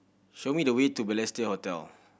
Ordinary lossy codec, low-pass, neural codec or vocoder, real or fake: none; none; none; real